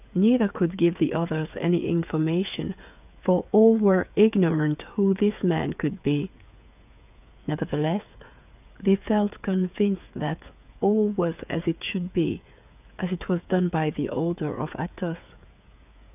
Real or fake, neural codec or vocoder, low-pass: fake; codec, 16 kHz, 8 kbps, FreqCodec, smaller model; 3.6 kHz